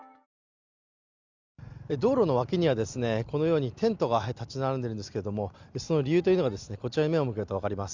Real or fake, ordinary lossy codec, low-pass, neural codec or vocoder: real; Opus, 64 kbps; 7.2 kHz; none